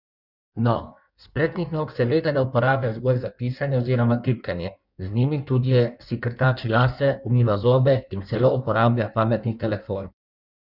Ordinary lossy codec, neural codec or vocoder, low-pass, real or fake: none; codec, 16 kHz in and 24 kHz out, 1.1 kbps, FireRedTTS-2 codec; 5.4 kHz; fake